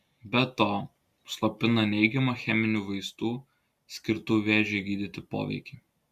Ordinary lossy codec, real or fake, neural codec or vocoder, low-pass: Opus, 64 kbps; real; none; 14.4 kHz